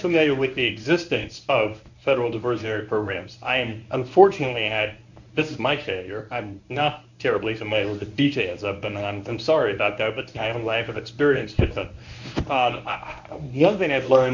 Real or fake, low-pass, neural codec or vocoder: fake; 7.2 kHz; codec, 24 kHz, 0.9 kbps, WavTokenizer, medium speech release version 1